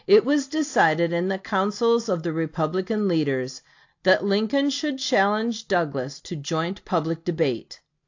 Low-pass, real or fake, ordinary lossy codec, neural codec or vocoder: 7.2 kHz; real; AAC, 48 kbps; none